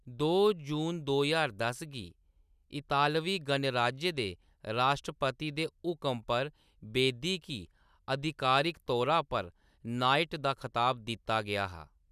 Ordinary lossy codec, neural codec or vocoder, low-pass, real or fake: none; none; 14.4 kHz; real